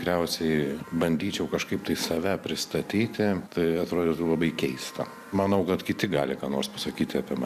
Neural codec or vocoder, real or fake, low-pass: vocoder, 44.1 kHz, 128 mel bands every 512 samples, BigVGAN v2; fake; 14.4 kHz